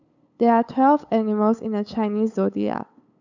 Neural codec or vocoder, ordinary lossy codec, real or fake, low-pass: codec, 16 kHz, 8 kbps, FunCodec, trained on LibriTTS, 25 frames a second; none; fake; 7.2 kHz